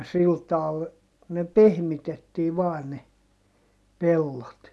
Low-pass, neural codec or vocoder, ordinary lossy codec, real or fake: none; none; none; real